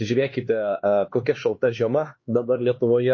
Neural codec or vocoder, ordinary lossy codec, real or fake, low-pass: codec, 16 kHz, 2 kbps, X-Codec, HuBERT features, trained on LibriSpeech; MP3, 32 kbps; fake; 7.2 kHz